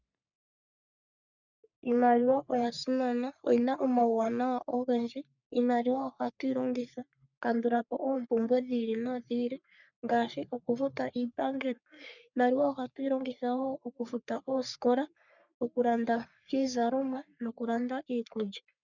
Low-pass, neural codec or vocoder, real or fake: 7.2 kHz; codec, 44.1 kHz, 3.4 kbps, Pupu-Codec; fake